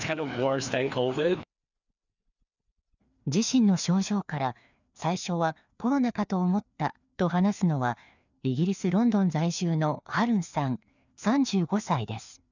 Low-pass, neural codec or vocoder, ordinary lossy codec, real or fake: 7.2 kHz; codec, 16 kHz, 2 kbps, FreqCodec, larger model; none; fake